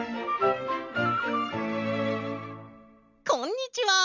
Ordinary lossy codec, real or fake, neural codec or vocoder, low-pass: none; real; none; 7.2 kHz